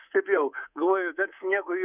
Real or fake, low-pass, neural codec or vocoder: fake; 3.6 kHz; codec, 24 kHz, 3.1 kbps, DualCodec